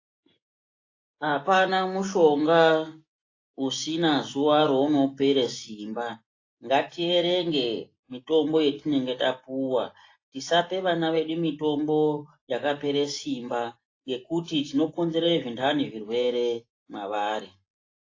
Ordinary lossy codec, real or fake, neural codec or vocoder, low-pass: AAC, 32 kbps; real; none; 7.2 kHz